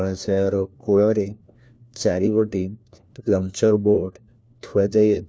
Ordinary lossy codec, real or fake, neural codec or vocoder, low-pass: none; fake; codec, 16 kHz, 1 kbps, FunCodec, trained on LibriTTS, 50 frames a second; none